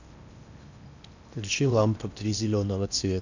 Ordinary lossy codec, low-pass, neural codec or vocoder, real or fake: none; 7.2 kHz; codec, 16 kHz in and 24 kHz out, 0.8 kbps, FocalCodec, streaming, 65536 codes; fake